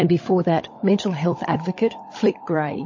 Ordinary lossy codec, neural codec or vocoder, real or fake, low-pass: MP3, 32 kbps; codec, 16 kHz, 16 kbps, FunCodec, trained on LibriTTS, 50 frames a second; fake; 7.2 kHz